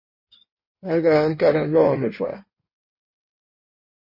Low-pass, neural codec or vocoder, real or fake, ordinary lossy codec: 5.4 kHz; codec, 16 kHz in and 24 kHz out, 1.1 kbps, FireRedTTS-2 codec; fake; MP3, 24 kbps